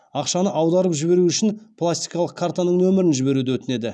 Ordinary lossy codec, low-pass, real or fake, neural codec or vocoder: none; none; real; none